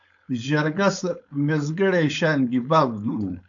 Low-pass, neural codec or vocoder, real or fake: 7.2 kHz; codec, 16 kHz, 4.8 kbps, FACodec; fake